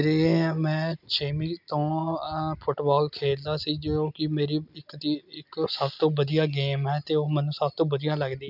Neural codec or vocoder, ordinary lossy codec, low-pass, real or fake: none; none; 5.4 kHz; real